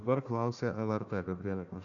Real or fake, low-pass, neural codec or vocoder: fake; 7.2 kHz; codec, 16 kHz, 1 kbps, FunCodec, trained on Chinese and English, 50 frames a second